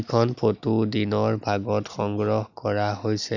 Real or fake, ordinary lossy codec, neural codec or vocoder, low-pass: real; none; none; 7.2 kHz